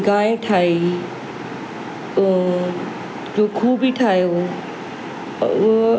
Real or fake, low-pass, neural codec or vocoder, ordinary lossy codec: real; none; none; none